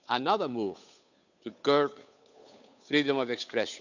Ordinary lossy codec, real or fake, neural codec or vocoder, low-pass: none; fake; codec, 16 kHz, 8 kbps, FunCodec, trained on Chinese and English, 25 frames a second; 7.2 kHz